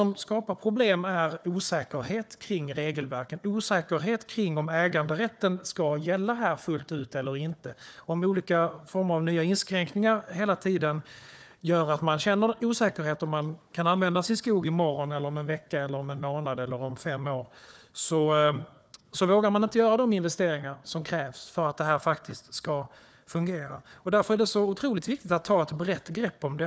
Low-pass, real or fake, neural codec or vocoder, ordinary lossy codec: none; fake; codec, 16 kHz, 4 kbps, FunCodec, trained on Chinese and English, 50 frames a second; none